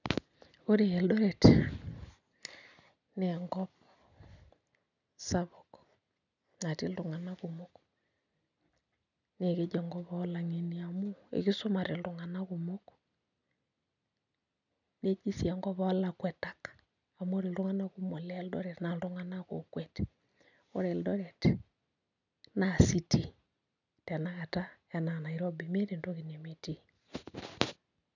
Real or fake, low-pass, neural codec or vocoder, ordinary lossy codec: real; 7.2 kHz; none; none